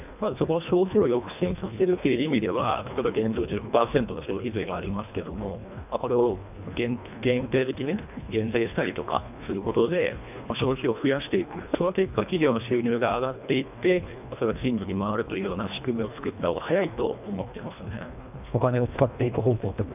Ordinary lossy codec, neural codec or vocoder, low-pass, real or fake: none; codec, 24 kHz, 1.5 kbps, HILCodec; 3.6 kHz; fake